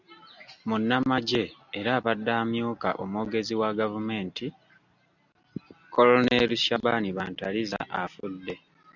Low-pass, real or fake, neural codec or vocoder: 7.2 kHz; real; none